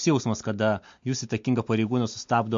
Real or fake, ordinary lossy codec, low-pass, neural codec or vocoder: real; MP3, 48 kbps; 7.2 kHz; none